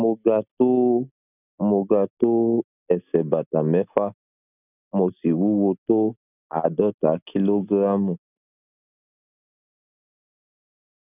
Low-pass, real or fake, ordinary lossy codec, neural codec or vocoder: 3.6 kHz; real; none; none